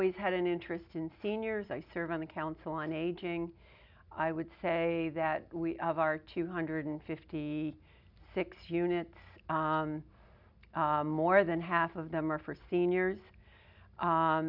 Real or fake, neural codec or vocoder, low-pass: real; none; 5.4 kHz